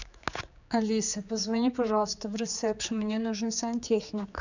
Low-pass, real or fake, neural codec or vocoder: 7.2 kHz; fake; codec, 16 kHz, 4 kbps, X-Codec, HuBERT features, trained on general audio